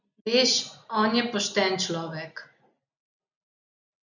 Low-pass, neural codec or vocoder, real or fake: 7.2 kHz; none; real